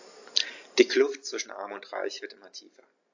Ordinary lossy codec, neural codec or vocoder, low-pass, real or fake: AAC, 48 kbps; none; 7.2 kHz; real